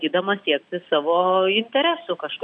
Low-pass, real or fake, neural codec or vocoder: 9.9 kHz; real; none